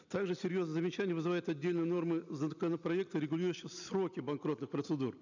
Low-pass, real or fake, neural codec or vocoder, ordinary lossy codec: 7.2 kHz; real; none; none